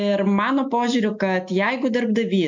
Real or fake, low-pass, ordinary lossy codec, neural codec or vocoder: real; 7.2 kHz; MP3, 48 kbps; none